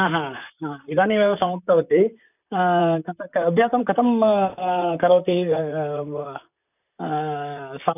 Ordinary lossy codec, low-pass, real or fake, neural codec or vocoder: none; 3.6 kHz; fake; vocoder, 44.1 kHz, 128 mel bands, Pupu-Vocoder